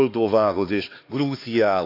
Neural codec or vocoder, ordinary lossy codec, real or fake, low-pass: codec, 16 kHz, 2 kbps, X-Codec, HuBERT features, trained on LibriSpeech; AAC, 32 kbps; fake; 5.4 kHz